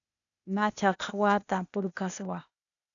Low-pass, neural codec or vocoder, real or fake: 7.2 kHz; codec, 16 kHz, 0.8 kbps, ZipCodec; fake